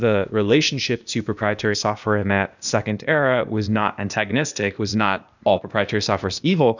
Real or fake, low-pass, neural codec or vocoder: fake; 7.2 kHz; codec, 16 kHz, 0.8 kbps, ZipCodec